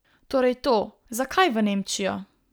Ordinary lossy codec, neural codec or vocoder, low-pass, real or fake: none; none; none; real